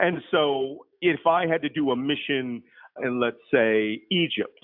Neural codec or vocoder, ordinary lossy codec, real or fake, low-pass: none; AAC, 48 kbps; real; 5.4 kHz